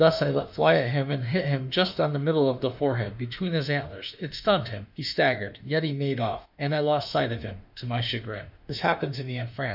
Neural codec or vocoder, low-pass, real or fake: autoencoder, 48 kHz, 32 numbers a frame, DAC-VAE, trained on Japanese speech; 5.4 kHz; fake